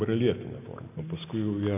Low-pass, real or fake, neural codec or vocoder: 3.6 kHz; real; none